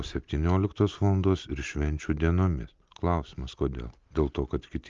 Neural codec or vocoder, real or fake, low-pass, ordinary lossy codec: none; real; 7.2 kHz; Opus, 32 kbps